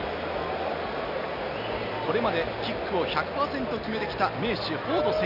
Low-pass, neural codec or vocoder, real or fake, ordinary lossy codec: 5.4 kHz; none; real; none